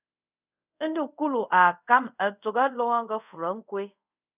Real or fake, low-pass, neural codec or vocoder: fake; 3.6 kHz; codec, 24 kHz, 0.5 kbps, DualCodec